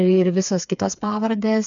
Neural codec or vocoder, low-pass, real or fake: codec, 16 kHz, 4 kbps, FreqCodec, smaller model; 7.2 kHz; fake